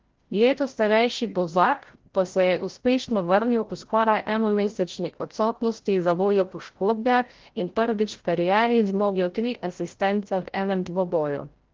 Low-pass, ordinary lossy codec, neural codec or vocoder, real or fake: 7.2 kHz; Opus, 16 kbps; codec, 16 kHz, 0.5 kbps, FreqCodec, larger model; fake